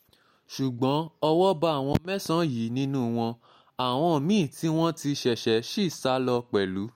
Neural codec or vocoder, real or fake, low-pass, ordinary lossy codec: none; real; 19.8 kHz; MP3, 64 kbps